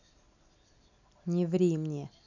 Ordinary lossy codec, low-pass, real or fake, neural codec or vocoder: none; 7.2 kHz; real; none